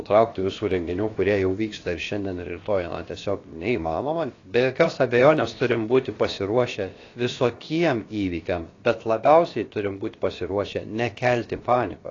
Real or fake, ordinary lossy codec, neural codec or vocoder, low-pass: fake; AAC, 32 kbps; codec, 16 kHz, about 1 kbps, DyCAST, with the encoder's durations; 7.2 kHz